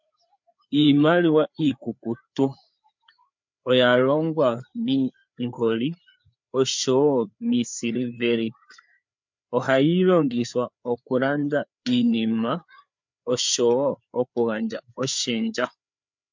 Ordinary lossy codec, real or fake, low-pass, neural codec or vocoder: MP3, 64 kbps; fake; 7.2 kHz; codec, 16 kHz, 4 kbps, FreqCodec, larger model